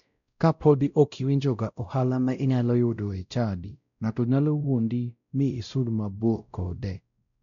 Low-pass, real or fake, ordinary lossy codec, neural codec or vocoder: 7.2 kHz; fake; none; codec, 16 kHz, 0.5 kbps, X-Codec, WavLM features, trained on Multilingual LibriSpeech